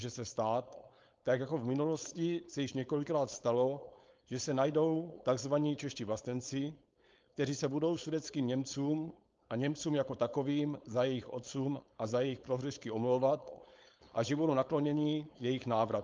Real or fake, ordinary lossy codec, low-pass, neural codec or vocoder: fake; Opus, 24 kbps; 7.2 kHz; codec, 16 kHz, 4.8 kbps, FACodec